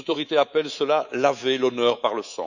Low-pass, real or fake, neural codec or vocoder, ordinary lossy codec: 7.2 kHz; fake; codec, 24 kHz, 3.1 kbps, DualCodec; none